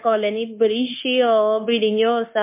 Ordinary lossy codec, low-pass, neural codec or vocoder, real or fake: MP3, 24 kbps; 3.6 kHz; codec, 16 kHz, 0.9 kbps, LongCat-Audio-Codec; fake